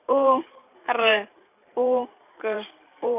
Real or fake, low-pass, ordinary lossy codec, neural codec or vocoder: fake; 3.6 kHz; none; vocoder, 44.1 kHz, 128 mel bands every 512 samples, BigVGAN v2